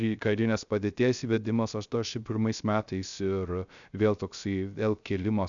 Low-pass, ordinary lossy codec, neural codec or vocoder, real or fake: 7.2 kHz; MP3, 96 kbps; codec, 16 kHz, 0.3 kbps, FocalCodec; fake